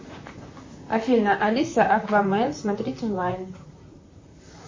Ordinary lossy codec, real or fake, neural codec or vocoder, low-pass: MP3, 32 kbps; fake; vocoder, 44.1 kHz, 128 mel bands, Pupu-Vocoder; 7.2 kHz